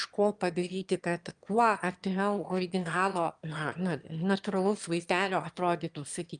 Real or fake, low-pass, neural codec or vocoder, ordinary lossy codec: fake; 9.9 kHz; autoencoder, 22.05 kHz, a latent of 192 numbers a frame, VITS, trained on one speaker; Opus, 32 kbps